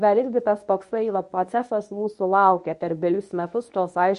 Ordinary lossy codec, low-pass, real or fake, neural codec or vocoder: MP3, 48 kbps; 10.8 kHz; fake; codec, 24 kHz, 0.9 kbps, WavTokenizer, medium speech release version 1